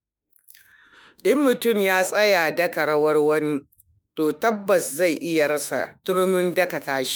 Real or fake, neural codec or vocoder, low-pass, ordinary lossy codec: fake; autoencoder, 48 kHz, 32 numbers a frame, DAC-VAE, trained on Japanese speech; none; none